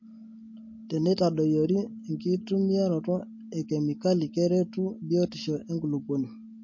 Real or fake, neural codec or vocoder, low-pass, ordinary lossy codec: real; none; 7.2 kHz; MP3, 32 kbps